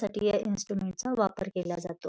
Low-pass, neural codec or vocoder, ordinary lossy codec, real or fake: none; none; none; real